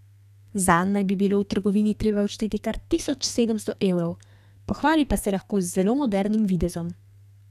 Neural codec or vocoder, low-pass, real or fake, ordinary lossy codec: codec, 32 kHz, 1.9 kbps, SNAC; 14.4 kHz; fake; none